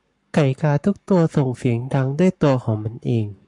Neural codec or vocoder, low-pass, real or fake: codec, 44.1 kHz, 7.8 kbps, Pupu-Codec; 10.8 kHz; fake